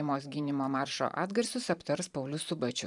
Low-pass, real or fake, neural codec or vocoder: 10.8 kHz; fake; vocoder, 44.1 kHz, 128 mel bands every 512 samples, BigVGAN v2